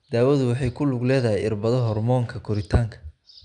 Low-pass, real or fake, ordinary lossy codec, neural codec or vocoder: 9.9 kHz; real; none; none